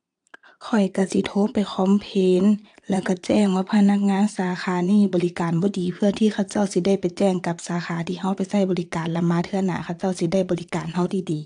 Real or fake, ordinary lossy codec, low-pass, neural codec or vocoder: fake; AAC, 64 kbps; 9.9 kHz; vocoder, 22.05 kHz, 80 mel bands, Vocos